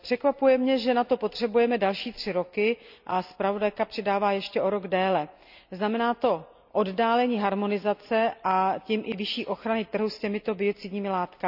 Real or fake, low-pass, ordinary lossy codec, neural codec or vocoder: real; 5.4 kHz; none; none